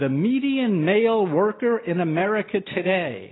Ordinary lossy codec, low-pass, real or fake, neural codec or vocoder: AAC, 16 kbps; 7.2 kHz; real; none